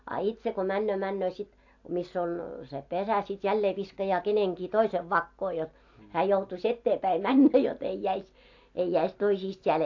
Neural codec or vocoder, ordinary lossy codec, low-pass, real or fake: none; AAC, 48 kbps; 7.2 kHz; real